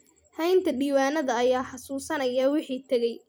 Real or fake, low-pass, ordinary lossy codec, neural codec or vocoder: fake; none; none; vocoder, 44.1 kHz, 128 mel bands every 256 samples, BigVGAN v2